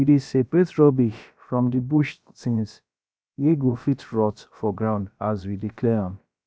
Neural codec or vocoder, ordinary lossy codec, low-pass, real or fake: codec, 16 kHz, about 1 kbps, DyCAST, with the encoder's durations; none; none; fake